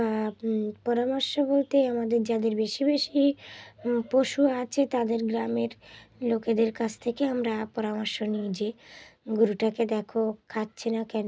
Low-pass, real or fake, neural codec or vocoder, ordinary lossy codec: none; real; none; none